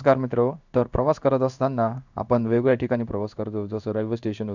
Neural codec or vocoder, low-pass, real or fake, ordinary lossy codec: codec, 16 kHz in and 24 kHz out, 1 kbps, XY-Tokenizer; 7.2 kHz; fake; none